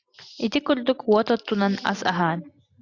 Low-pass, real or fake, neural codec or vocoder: 7.2 kHz; real; none